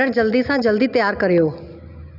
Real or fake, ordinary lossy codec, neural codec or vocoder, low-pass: real; none; none; 5.4 kHz